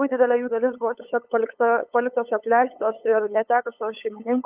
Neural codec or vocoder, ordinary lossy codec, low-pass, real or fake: codec, 16 kHz, 8 kbps, FunCodec, trained on LibriTTS, 25 frames a second; Opus, 32 kbps; 3.6 kHz; fake